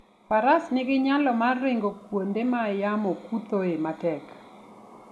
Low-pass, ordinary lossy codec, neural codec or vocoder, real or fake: none; none; none; real